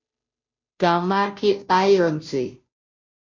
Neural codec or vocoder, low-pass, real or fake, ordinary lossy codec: codec, 16 kHz, 0.5 kbps, FunCodec, trained on Chinese and English, 25 frames a second; 7.2 kHz; fake; MP3, 64 kbps